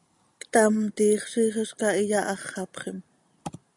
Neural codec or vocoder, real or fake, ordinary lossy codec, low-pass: none; real; MP3, 96 kbps; 10.8 kHz